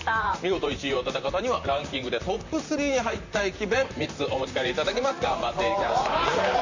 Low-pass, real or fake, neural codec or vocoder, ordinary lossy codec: 7.2 kHz; fake; vocoder, 44.1 kHz, 128 mel bands, Pupu-Vocoder; AAC, 48 kbps